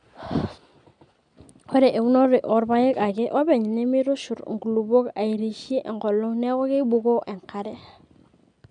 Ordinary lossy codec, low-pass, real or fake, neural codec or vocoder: none; 9.9 kHz; real; none